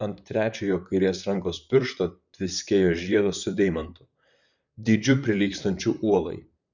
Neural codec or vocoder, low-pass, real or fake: vocoder, 44.1 kHz, 128 mel bands, Pupu-Vocoder; 7.2 kHz; fake